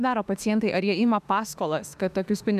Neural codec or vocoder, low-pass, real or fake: autoencoder, 48 kHz, 32 numbers a frame, DAC-VAE, trained on Japanese speech; 14.4 kHz; fake